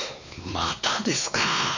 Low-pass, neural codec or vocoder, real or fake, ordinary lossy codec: 7.2 kHz; codec, 16 kHz, 2 kbps, X-Codec, WavLM features, trained on Multilingual LibriSpeech; fake; none